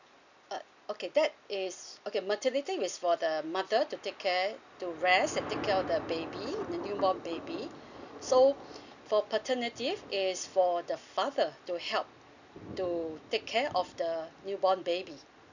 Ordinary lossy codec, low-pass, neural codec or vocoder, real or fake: none; 7.2 kHz; none; real